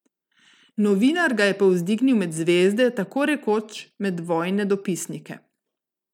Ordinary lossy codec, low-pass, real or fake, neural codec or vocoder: none; 19.8 kHz; real; none